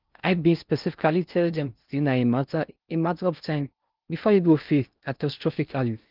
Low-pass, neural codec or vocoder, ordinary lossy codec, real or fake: 5.4 kHz; codec, 16 kHz in and 24 kHz out, 0.6 kbps, FocalCodec, streaming, 4096 codes; Opus, 24 kbps; fake